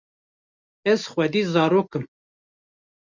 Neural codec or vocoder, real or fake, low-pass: none; real; 7.2 kHz